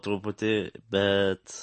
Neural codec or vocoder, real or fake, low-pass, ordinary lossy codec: none; real; 9.9 kHz; MP3, 32 kbps